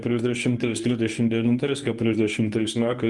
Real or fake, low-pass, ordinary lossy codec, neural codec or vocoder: fake; 10.8 kHz; Opus, 32 kbps; codec, 24 kHz, 0.9 kbps, WavTokenizer, medium speech release version 1